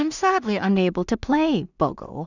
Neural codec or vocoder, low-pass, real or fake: codec, 16 kHz in and 24 kHz out, 0.4 kbps, LongCat-Audio-Codec, two codebook decoder; 7.2 kHz; fake